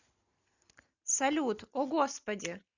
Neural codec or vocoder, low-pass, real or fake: none; 7.2 kHz; real